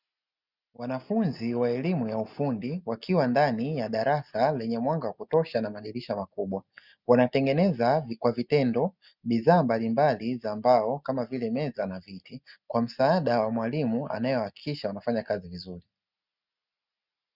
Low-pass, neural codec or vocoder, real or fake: 5.4 kHz; none; real